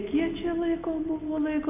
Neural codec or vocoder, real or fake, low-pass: none; real; 3.6 kHz